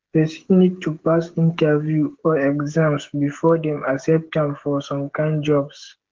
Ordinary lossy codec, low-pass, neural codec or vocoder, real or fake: Opus, 16 kbps; 7.2 kHz; codec, 16 kHz, 16 kbps, FreqCodec, smaller model; fake